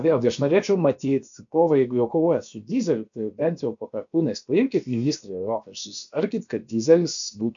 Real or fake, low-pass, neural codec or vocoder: fake; 7.2 kHz; codec, 16 kHz, about 1 kbps, DyCAST, with the encoder's durations